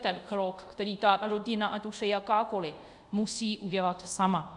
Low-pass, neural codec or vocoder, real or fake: 10.8 kHz; codec, 24 kHz, 0.5 kbps, DualCodec; fake